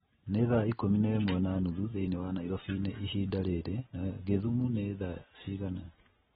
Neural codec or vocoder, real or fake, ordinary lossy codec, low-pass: none; real; AAC, 16 kbps; 19.8 kHz